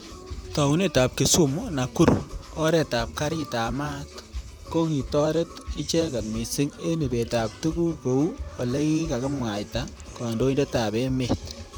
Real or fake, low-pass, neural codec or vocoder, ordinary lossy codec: fake; none; vocoder, 44.1 kHz, 128 mel bands, Pupu-Vocoder; none